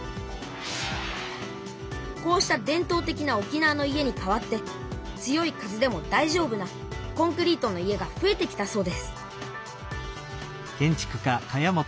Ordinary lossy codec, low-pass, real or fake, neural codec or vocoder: none; none; real; none